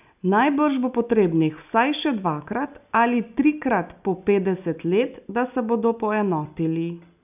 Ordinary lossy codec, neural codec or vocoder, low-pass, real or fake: none; none; 3.6 kHz; real